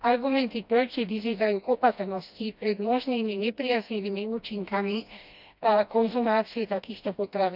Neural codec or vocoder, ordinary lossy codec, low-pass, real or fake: codec, 16 kHz, 1 kbps, FreqCodec, smaller model; none; 5.4 kHz; fake